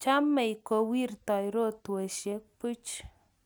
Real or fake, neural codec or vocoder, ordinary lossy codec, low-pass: real; none; none; none